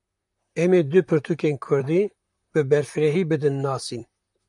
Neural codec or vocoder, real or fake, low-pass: vocoder, 44.1 kHz, 128 mel bands, Pupu-Vocoder; fake; 10.8 kHz